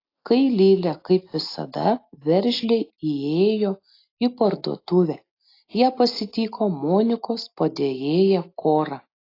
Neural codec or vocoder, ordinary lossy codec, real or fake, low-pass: none; AAC, 32 kbps; real; 5.4 kHz